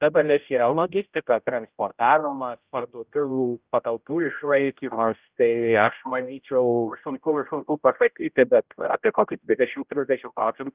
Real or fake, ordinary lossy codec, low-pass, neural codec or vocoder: fake; Opus, 32 kbps; 3.6 kHz; codec, 16 kHz, 0.5 kbps, X-Codec, HuBERT features, trained on general audio